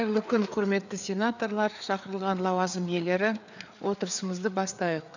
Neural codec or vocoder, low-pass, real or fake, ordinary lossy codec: codec, 16 kHz, 4 kbps, FreqCodec, larger model; 7.2 kHz; fake; none